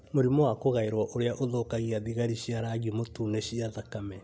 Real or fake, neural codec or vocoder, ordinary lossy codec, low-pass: real; none; none; none